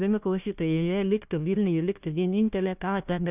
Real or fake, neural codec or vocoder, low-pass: fake; codec, 16 kHz, 1 kbps, FunCodec, trained on Chinese and English, 50 frames a second; 3.6 kHz